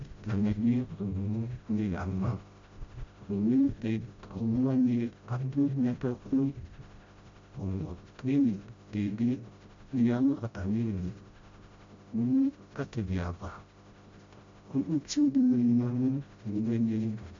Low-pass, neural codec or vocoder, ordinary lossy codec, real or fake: 7.2 kHz; codec, 16 kHz, 0.5 kbps, FreqCodec, smaller model; MP3, 48 kbps; fake